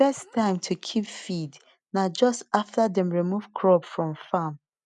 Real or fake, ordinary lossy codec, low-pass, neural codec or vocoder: real; none; 10.8 kHz; none